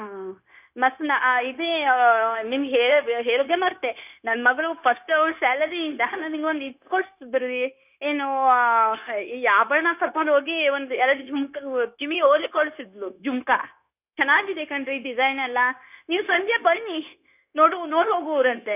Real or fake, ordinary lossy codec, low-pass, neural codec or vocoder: fake; none; 3.6 kHz; codec, 16 kHz, 0.9 kbps, LongCat-Audio-Codec